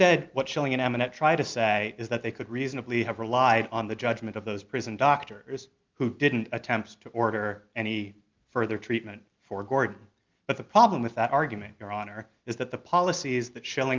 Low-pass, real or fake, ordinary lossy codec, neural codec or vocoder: 7.2 kHz; real; Opus, 24 kbps; none